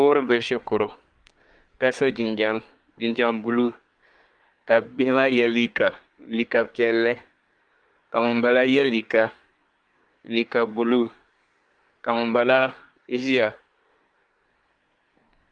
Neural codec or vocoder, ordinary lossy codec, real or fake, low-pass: codec, 24 kHz, 1 kbps, SNAC; Opus, 32 kbps; fake; 9.9 kHz